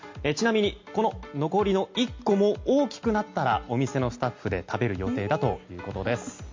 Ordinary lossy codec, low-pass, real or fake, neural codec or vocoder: MP3, 48 kbps; 7.2 kHz; real; none